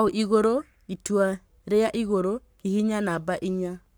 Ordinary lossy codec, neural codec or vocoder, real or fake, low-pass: none; codec, 44.1 kHz, 7.8 kbps, Pupu-Codec; fake; none